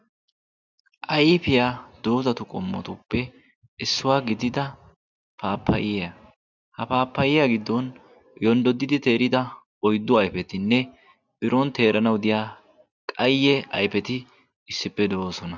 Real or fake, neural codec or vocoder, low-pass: real; none; 7.2 kHz